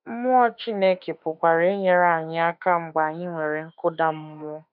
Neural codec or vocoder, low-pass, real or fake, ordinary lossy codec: autoencoder, 48 kHz, 32 numbers a frame, DAC-VAE, trained on Japanese speech; 5.4 kHz; fake; none